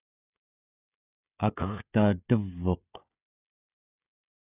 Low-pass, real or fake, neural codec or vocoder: 3.6 kHz; fake; codec, 16 kHz, 16 kbps, FreqCodec, smaller model